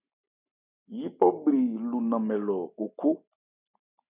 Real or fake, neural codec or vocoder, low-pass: real; none; 3.6 kHz